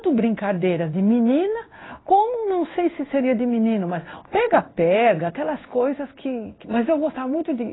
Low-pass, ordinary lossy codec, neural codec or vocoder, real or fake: 7.2 kHz; AAC, 16 kbps; codec, 16 kHz in and 24 kHz out, 1 kbps, XY-Tokenizer; fake